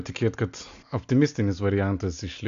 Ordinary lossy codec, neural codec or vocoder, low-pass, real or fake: AAC, 64 kbps; none; 7.2 kHz; real